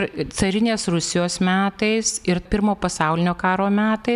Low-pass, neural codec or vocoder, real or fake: 14.4 kHz; none; real